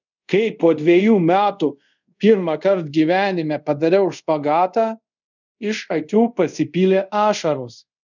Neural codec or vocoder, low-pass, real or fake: codec, 24 kHz, 0.5 kbps, DualCodec; 7.2 kHz; fake